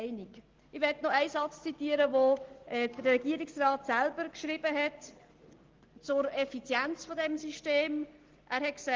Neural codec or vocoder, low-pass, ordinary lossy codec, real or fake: none; 7.2 kHz; Opus, 16 kbps; real